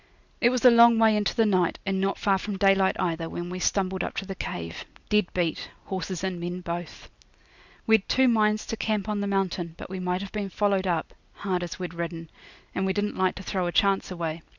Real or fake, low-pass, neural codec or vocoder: real; 7.2 kHz; none